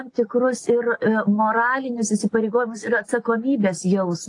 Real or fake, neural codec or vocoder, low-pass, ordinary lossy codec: real; none; 10.8 kHz; AAC, 48 kbps